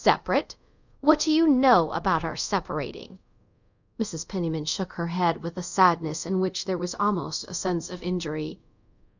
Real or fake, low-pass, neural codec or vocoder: fake; 7.2 kHz; codec, 24 kHz, 0.5 kbps, DualCodec